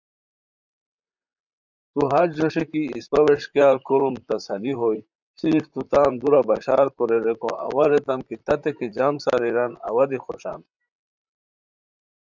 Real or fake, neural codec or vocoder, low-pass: fake; vocoder, 44.1 kHz, 128 mel bands, Pupu-Vocoder; 7.2 kHz